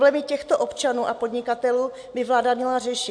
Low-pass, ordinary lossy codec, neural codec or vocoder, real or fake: 9.9 kHz; MP3, 64 kbps; none; real